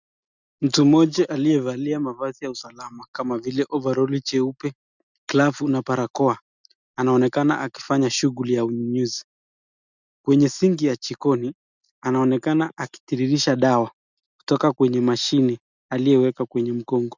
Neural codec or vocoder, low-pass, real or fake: none; 7.2 kHz; real